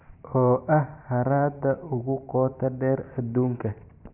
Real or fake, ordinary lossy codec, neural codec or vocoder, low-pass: fake; AAC, 32 kbps; vocoder, 44.1 kHz, 128 mel bands every 512 samples, BigVGAN v2; 3.6 kHz